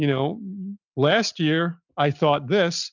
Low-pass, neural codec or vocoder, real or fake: 7.2 kHz; none; real